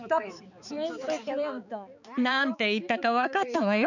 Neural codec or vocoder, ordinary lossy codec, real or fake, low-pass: codec, 16 kHz, 4 kbps, X-Codec, HuBERT features, trained on balanced general audio; none; fake; 7.2 kHz